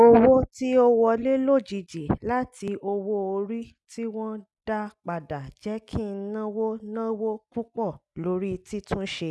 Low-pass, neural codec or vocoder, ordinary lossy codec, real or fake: none; none; none; real